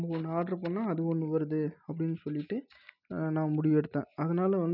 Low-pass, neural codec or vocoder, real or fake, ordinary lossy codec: 5.4 kHz; none; real; none